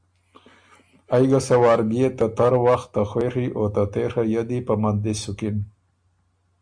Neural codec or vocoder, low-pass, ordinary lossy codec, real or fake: none; 9.9 kHz; Opus, 64 kbps; real